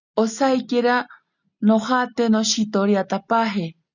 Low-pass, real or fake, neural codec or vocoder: 7.2 kHz; real; none